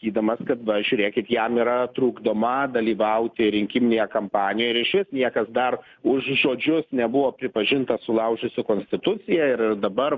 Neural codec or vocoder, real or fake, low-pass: none; real; 7.2 kHz